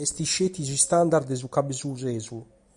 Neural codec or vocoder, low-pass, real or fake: none; 10.8 kHz; real